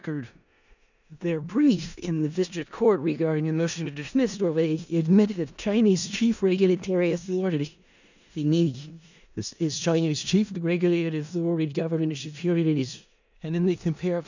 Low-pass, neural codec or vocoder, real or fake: 7.2 kHz; codec, 16 kHz in and 24 kHz out, 0.4 kbps, LongCat-Audio-Codec, four codebook decoder; fake